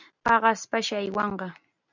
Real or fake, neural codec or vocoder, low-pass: real; none; 7.2 kHz